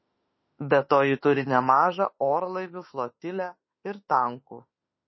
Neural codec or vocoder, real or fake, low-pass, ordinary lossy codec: autoencoder, 48 kHz, 32 numbers a frame, DAC-VAE, trained on Japanese speech; fake; 7.2 kHz; MP3, 24 kbps